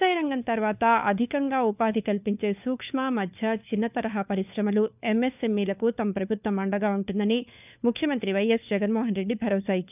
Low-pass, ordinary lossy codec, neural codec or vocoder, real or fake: 3.6 kHz; none; codec, 16 kHz, 4 kbps, FunCodec, trained on LibriTTS, 50 frames a second; fake